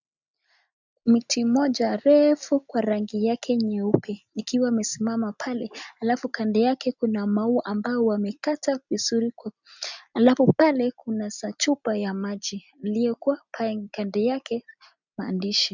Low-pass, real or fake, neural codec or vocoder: 7.2 kHz; real; none